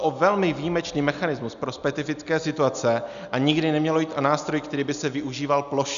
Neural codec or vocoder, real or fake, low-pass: none; real; 7.2 kHz